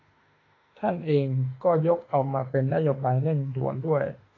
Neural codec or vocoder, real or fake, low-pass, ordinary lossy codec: autoencoder, 48 kHz, 32 numbers a frame, DAC-VAE, trained on Japanese speech; fake; 7.2 kHz; AAC, 32 kbps